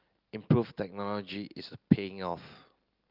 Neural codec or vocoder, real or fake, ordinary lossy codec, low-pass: none; real; Opus, 32 kbps; 5.4 kHz